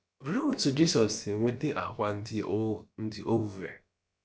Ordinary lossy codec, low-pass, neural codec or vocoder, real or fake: none; none; codec, 16 kHz, about 1 kbps, DyCAST, with the encoder's durations; fake